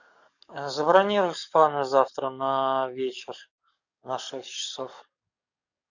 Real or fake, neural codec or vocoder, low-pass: fake; codec, 44.1 kHz, 7.8 kbps, DAC; 7.2 kHz